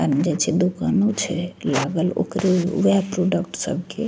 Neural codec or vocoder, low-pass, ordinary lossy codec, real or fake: none; none; none; real